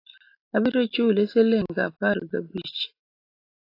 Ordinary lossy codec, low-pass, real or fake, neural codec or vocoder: AAC, 32 kbps; 5.4 kHz; real; none